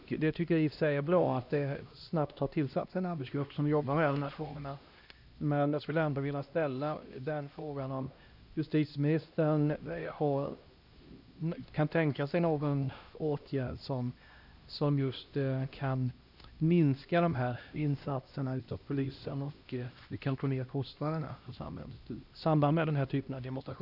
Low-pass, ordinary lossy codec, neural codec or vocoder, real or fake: 5.4 kHz; none; codec, 16 kHz, 1 kbps, X-Codec, HuBERT features, trained on LibriSpeech; fake